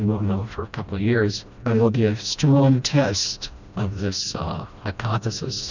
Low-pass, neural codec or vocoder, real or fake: 7.2 kHz; codec, 16 kHz, 1 kbps, FreqCodec, smaller model; fake